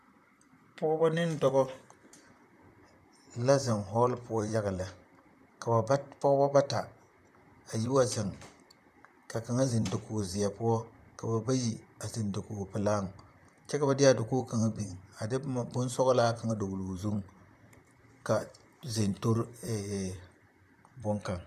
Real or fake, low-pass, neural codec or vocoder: fake; 14.4 kHz; vocoder, 44.1 kHz, 128 mel bands, Pupu-Vocoder